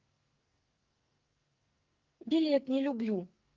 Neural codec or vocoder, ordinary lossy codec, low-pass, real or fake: codec, 44.1 kHz, 2.6 kbps, SNAC; Opus, 32 kbps; 7.2 kHz; fake